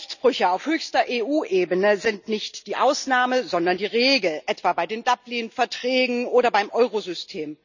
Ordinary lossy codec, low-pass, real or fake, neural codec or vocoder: none; 7.2 kHz; real; none